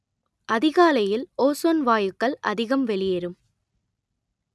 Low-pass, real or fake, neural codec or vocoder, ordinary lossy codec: none; real; none; none